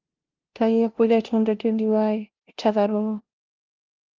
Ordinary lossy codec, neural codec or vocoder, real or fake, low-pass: Opus, 32 kbps; codec, 16 kHz, 0.5 kbps, FunCodec, trained on LibriTTS, 25 frames a second; fake; 7.2 kHz